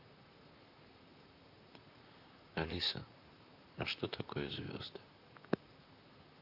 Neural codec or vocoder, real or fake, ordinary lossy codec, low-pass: vocoder, 44.1 kHz, 128 mel bands, Pupu-Vocoder; fake; Opus, 64 kbps; 5.4 kHz